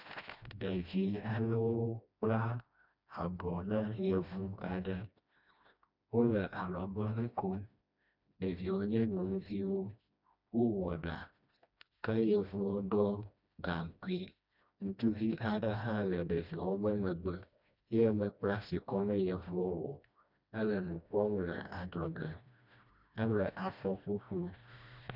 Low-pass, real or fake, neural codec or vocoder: 5.4 kHz; fake; codec, 16 kHz, 1 kbps, FreqCodec, smaller model